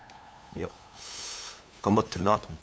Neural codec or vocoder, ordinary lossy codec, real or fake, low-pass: codec, 16 kHz, 8 kbps, FunCodec, trained on LibriTTS, 25 frames a second; none; fake; none